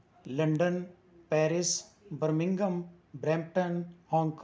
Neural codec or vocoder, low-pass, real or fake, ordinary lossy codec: none; none; real; none